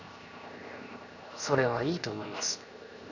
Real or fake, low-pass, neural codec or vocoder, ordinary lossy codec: fake; 7.2 kHz; codec, 16 kHz, 0.7 kbps, FocalCodec; none